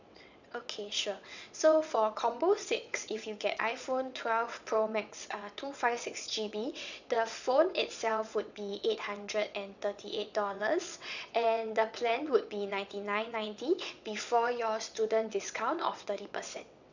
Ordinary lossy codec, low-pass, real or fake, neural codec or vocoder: none; 7.2 kHz; fake; vocoder, 22.05 kHz, 80 mel bands, WaveNeXt